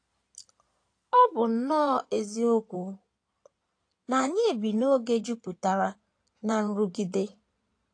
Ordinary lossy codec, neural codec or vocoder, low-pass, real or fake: AAC, 48 kbps; codec, 16 kHz in and 24 kHz out, 2.2 kbps, FireRedTTS-2 codec; 9.9 kHz; fake